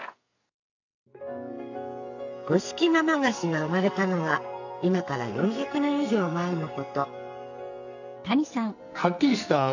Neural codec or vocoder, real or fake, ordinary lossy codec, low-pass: codec, 44.1 kHz, 2.6 kbps, SNAC; fake; none; 7.2 kHz